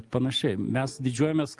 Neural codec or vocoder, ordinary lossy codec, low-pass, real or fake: none; Opus, 24 kbps; 10.8 kHz; real